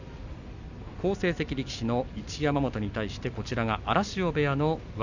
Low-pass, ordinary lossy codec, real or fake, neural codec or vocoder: 7.2 kHz; none; real; none